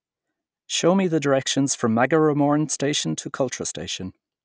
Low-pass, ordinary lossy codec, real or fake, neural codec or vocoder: none; none; real; none